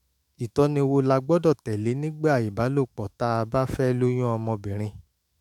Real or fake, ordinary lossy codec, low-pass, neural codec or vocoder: fake; MP3, 96 kbps; 19.8 kHz; autoencoder, 48 kHz, 128 numbers a frame, DAC-VAE, trained on Japanese speech